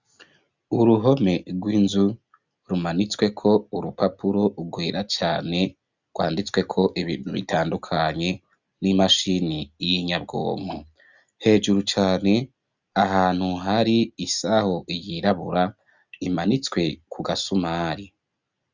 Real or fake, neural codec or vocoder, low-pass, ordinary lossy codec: real; none; 7.2 kHz; Opus, 64 kbps